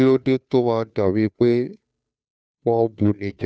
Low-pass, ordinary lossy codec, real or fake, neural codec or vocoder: none; none; fake; codec, 16 kHz, 2 kbps, FunCodec, trained on Chinese and English, 25 frames a second